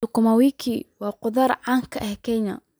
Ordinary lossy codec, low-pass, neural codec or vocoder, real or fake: none; none; none; real